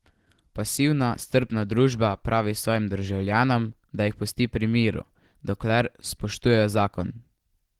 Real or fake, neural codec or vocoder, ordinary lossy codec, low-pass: real; none; Opus, 16 kbps; 19.8 kHz